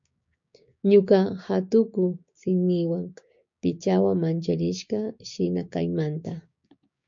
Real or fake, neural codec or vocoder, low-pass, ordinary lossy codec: fake; codec, 16 kHz, 6 kbps, DAC; 7.2 kHz; MP3, 64 kbps